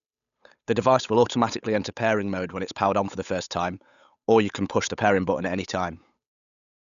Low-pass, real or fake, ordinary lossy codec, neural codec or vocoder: 7.2 kHz; fake; none; codec, 16 kHz, 8 kbps, FunCodec, trained on Chinese and English, 25 frames a second